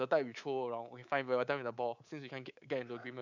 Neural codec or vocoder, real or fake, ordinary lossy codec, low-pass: codec, 24 kHz, 3.1 kbps, DualCodec; fake; none; 7.2 kHz